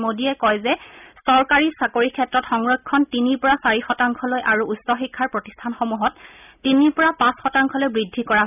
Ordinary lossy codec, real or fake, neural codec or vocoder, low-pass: none; real; none; 3.6 kHz